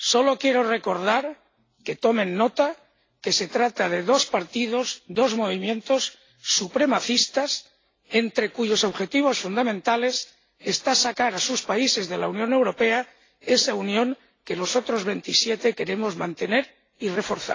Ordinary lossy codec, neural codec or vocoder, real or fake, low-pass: AAC, 32 kbps; none; real; 7.2 kHz